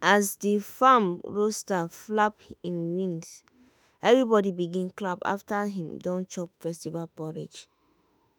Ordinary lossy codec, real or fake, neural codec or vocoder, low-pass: none; fake; autoencoder, 48 kHz, 32 numbers a frame, DAC-VAE, trained on Japanese speech; none